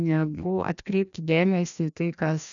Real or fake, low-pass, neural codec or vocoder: fake; 7.2 kHz; codec, 16 kHz, 1 kbps, FreqCodec, larger model